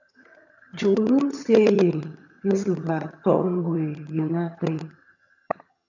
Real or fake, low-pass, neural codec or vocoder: fake; 7.2 kHz; vocoder, 22.05 kHz, 80 mel bands, HiFi-GAN